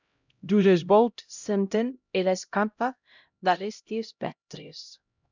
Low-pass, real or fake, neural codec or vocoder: 7.2 kHz; fake; codec, 16 kHz, 0.5 kbps, X-Codec, HuBERT features, trained on LibriSpeech